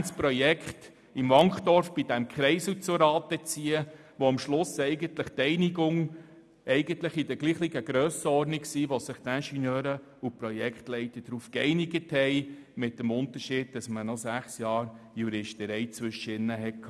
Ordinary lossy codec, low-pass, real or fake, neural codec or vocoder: none; none; real; none